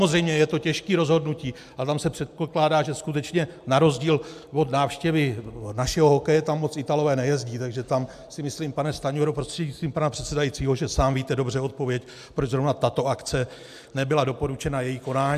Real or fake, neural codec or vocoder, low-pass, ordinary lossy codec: real; none; 14.4 kHz; AAC, 96 kbps